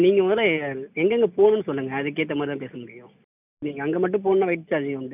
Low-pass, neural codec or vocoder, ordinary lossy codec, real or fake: 3.6 kHz; none; none; real